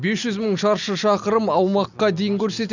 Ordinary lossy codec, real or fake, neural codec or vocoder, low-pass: none; real; none; 7.2 kHz